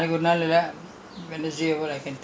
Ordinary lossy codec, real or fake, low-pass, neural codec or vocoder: none; real; none; none